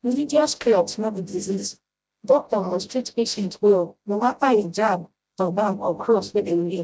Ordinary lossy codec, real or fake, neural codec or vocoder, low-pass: none; fake; codec, 16 kHz, 0.5 kbps, FreqCodec, smaller model; none